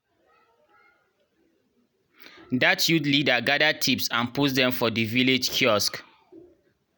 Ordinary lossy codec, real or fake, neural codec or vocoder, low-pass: none; real; none; none